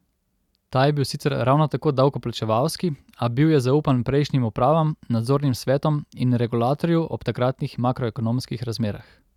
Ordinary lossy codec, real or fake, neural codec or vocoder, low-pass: none; real; none; 19.8 kHz